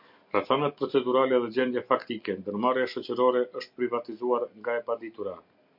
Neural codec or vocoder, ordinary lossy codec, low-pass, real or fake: none; MP3, 48 kbps; 5.4 kHz; real